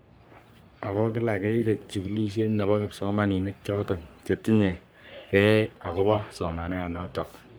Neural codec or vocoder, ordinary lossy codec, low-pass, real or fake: codec, 44.1 kHz, 3.4 kbps, Pupu-Codec; none; none; fake